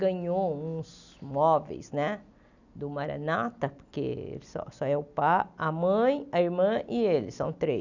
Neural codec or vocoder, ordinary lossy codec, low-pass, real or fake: none; none; 7.2 kHz; real